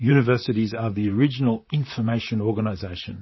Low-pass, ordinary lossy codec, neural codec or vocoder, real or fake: 7.2 kHz; MP3, 24 kbps; vocoder, 44.1 kHz, 128 mel bands, Pupu-Vocoder; fake